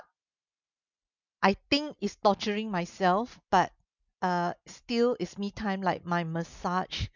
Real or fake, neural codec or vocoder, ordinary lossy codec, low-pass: real; none; none; 7.2 kHz